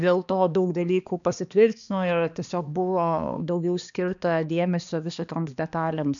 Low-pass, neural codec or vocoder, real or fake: 7.2 kHz; codec, 16 kHz, 2 kbps, X-Codec, HuBERT features, trained on balanced general audio; fake